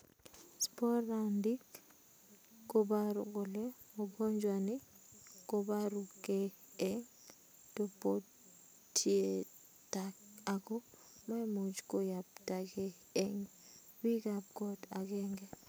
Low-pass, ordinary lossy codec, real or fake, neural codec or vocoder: none; none; real; none